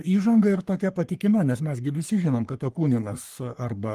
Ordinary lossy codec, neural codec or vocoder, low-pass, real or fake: Opus, 24 kbps; codec, 44.1 kHz, 3.4 kbps, Pupu-Codec; 14.4 kHz; fake